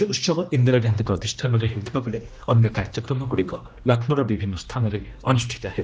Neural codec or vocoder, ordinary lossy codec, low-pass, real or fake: codec, 16 kHz, 1 kbps, X-Codec, HuBERT features, trained on general audio; none; none; fake